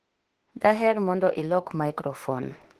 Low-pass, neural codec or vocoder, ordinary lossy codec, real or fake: 14.4 kHz; autoencoder, 48 kHz, 32 numbers a frame, DAC-VAE, trained on Japanese speech; Opus, 16 kbps; fake